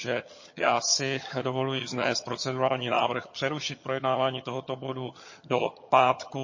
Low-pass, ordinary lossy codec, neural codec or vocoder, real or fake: 7.2 kHz; MP3, 32 kbps; vocoder, 22.05 kHz, 80 mel bands, HiFi-GAN; fake